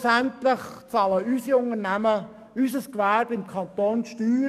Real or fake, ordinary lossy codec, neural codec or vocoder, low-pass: fake; none; codec, 44.1 kHz, 7.8 kbps, DAC; 14.4 kHz